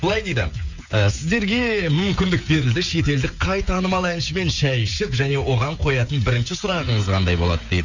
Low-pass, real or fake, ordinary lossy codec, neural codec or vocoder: 7.2 kHz; fake; Opus, 64 kbps; codec, 16 kHz, 16 kbps, FreqCodec, smaller model